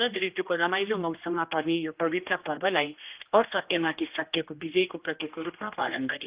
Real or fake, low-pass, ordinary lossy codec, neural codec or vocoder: fake; 3.6 kHz; Opus, 24 kbps; codec, 16 kHz, 1 kbps, X-Codec, HuBERT features, trained on general audio